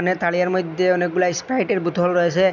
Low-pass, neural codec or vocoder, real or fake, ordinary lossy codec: 7.2 kHz; none; real; none